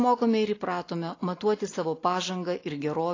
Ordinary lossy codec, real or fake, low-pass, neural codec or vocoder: AAC, 32 kbps; real; 7.2 kHz; none